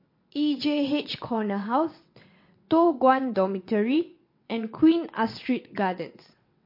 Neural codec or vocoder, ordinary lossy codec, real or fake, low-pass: vocoder, 22.05 kHz, 80 mel bands, WaveNeXt; MP3, 32 kbps; fake; 5.4 kHz